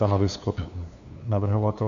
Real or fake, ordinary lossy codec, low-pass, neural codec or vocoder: fake; AAC, 64 kbps; 7.2 kHz; codec, 16 kHz, 2 kbps, FunCodec, trained on LibriTTS, 25 frames a second